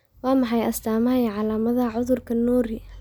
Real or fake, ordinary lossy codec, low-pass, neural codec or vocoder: real; none; none; none